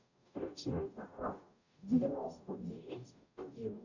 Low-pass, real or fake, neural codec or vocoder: 7.2 kHz; fake; codec, 44.1 kHz, 0.9 kbps, DAC